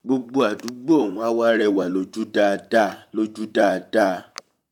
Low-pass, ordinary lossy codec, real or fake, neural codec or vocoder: 19.8 kHz; none; fake; vocoder, 44.1 kHz, 128 mel bands, Pupu-Vocoder